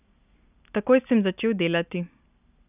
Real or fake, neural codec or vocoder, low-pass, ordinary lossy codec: real; none; 3.6 kHz; none